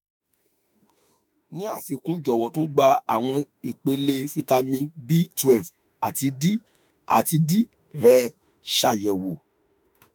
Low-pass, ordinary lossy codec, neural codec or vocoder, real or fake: none; none; autoencoder, 48 kHz, 32 numbers a frame, DAC-VAE, trained on Japanese speech; fake